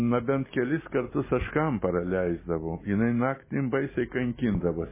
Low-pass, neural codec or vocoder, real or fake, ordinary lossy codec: 3.6 kHz; none; real; MP3, 16 kbps